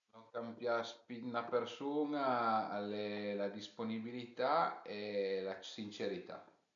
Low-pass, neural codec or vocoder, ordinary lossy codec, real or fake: 7.2 kHz; none; none; real